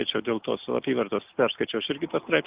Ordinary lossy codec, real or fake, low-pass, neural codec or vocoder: Opus, 24 kbps; real; 3.6 kHz; none